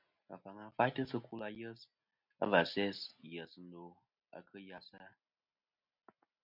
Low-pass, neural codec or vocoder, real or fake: 5.4 kHz; none; real